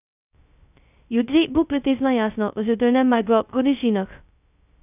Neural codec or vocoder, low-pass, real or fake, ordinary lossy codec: codec, 16 kHz, 0.2 kbps, FocalCodec; 3.6 kHz; fake; none